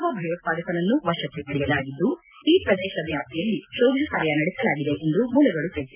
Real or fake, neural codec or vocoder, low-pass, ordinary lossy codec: real; none; 3.6 kHz; none